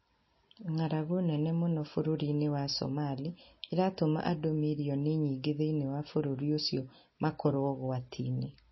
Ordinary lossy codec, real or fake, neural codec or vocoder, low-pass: MP3, 24 kbps; real; none; 7.2 kHz